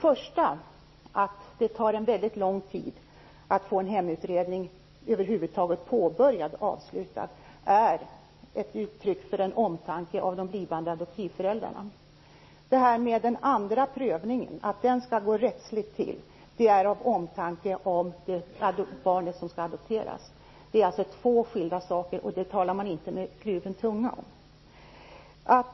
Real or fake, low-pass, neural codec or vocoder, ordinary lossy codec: real; 7.2 kHz; none; MP3, 24 kbps